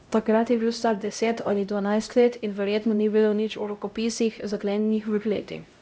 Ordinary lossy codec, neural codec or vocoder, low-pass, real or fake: none; codec, 16 kHz, 0.5 kbps, X-Codec, HuBERT features, trained on LibriSpeech; none; fake